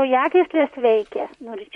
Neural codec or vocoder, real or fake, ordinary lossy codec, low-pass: vocoder, 44.1 kHz, 128 mel bands, Pupu-Vocoder; fake; MP3, 48 kbps; 19.8 kHz